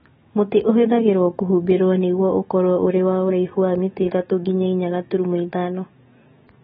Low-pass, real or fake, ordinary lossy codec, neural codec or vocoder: 19.8 kHz; fake; AAC, 16 kbps; autoencoder, 48 kHz, 128 numbers a frame, DAC-VAE, trained on Japanese speech